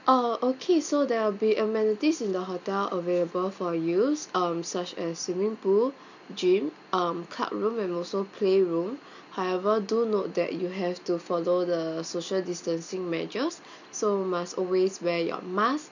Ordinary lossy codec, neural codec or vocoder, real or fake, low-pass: none; none; real; 7.2 kHz